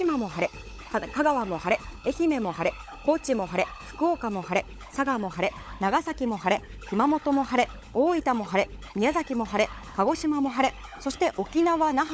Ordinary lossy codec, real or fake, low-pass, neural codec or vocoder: none; fake; none; codec, 16 kHz, 16 kbps, FunCodec, trained on LibriTTS, 50 frames a second